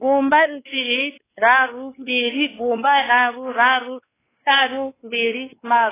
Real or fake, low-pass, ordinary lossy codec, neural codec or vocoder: fake; 3.6 kHz; AAC, 16 kbps; codec, 16 kHz, 2 kbps, X-Codec, WavLM features, trained on Multilingual LibriSpeech